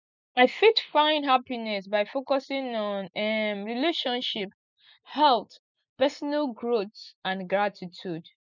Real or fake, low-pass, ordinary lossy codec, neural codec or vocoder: real; 7.2 kHz; none; none